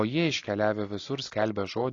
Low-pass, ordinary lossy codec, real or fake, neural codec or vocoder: 7.2 kHz; AAC, 32 kbps; real; none